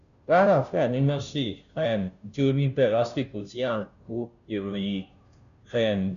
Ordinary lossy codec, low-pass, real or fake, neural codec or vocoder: none; 7.2 kHz; fake; codec, 16 kHz, 0.5 kbps, FunCodec, trained on Chinese and English, 25 frames a second